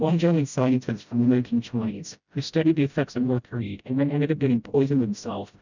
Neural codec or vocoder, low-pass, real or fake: codec, 16 kHz, 0.5 kbps, FreqCodec, smaller model; 7.2 kHz; fake